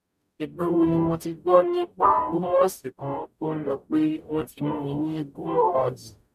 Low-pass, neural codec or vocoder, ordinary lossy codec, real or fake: 14.4 kHz; codec, 44.1 kHz, 0.9 kbps, DAC; none; fake